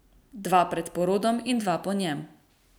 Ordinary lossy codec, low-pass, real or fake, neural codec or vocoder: none; none; real; none